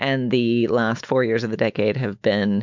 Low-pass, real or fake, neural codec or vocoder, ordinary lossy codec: 7.2 kHz; fake; autoencoder, 48 kHz, 128 numbers a frame, DAC-VAE, trained on Japanese speech; MP3, 64 kbps